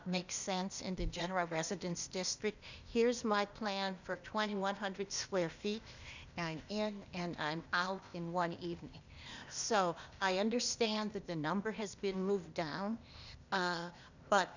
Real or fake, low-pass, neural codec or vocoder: fake; 7.2 kHz; codec, 16 kHz, 0.8 kbps, ZipCodec